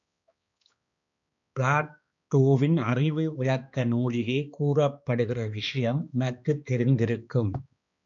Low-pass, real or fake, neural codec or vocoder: 7.2 kHz; fake; codec, 16 kHz, 2 kbps, X-Codec, HuBERT features, trained on balanced general audio